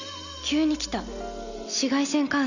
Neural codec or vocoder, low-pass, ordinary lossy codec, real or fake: none; 7.2 kHz; none; real